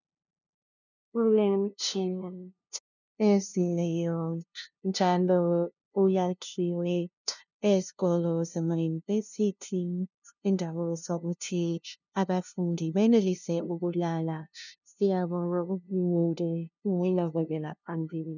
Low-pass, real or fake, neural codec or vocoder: 7.2 kHz; fake; codec, 16 kHz, 0.5 kbps, FunCodec, trained on LibriTTS, 25 frames a second